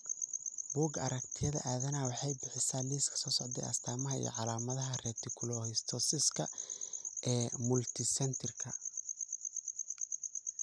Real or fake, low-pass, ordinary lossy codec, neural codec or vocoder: real; none; none; none